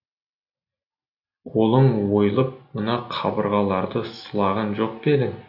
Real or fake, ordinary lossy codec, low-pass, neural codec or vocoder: real; none; 5.4 kHz; none